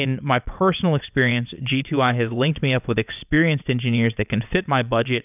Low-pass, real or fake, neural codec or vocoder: 3.6 kHz; fake; vocoder, 22.05 kHz, 80 mel bands, WaveNeXt